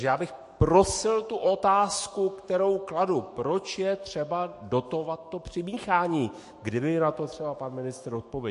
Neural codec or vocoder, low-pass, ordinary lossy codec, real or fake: autoencoder, 48 kHz, 128 numbers a frame, DAC-VAE, trained on Japanese speech; 14.4 kHz; MP3, 48 kbps; fake